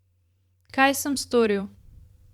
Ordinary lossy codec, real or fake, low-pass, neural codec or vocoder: Opus, 64 kbps; fake; 19.8 kHz; vocoder, 44.1 kHz, 128 mel bands every 512 samples, BigVGAN v2